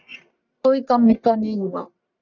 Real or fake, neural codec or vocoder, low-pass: fake; codec, 44.1 kHz, 1.7 kbps, Pupu-Codec; 7.2 kHz